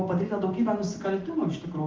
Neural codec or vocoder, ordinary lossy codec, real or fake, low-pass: none; Opus, 32 kbps; real; 7.2 kHz